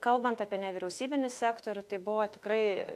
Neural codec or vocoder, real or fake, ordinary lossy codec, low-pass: autoencoder, 48 kHz, 32 numbers a frame, DAC-VAE, trained on Japanese speech; fake; AAC, 64 kbps; 14.4 kHz